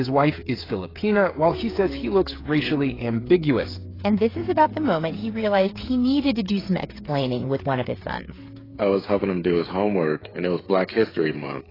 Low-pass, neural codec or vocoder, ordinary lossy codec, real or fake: 5.4 kHz; codec, 16 kHz, 8 kbps, FreqCodec, smaller model; AAC, 24 kbps; fake